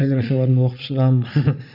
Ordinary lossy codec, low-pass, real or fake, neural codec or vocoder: none; 5.4 kHz; fake; vocoder, 44.1 kHz, 80 mel bands, Vocos